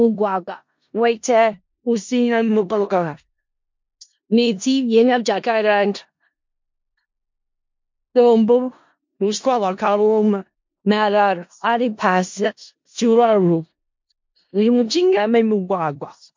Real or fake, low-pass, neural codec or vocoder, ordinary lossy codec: fake; 7.2 kHz; codec, 16 kHz in and 24 kHz out, 0.4 kbps, LongCat-Audio-Codec, four codebook decoder; MP3, 48 kbps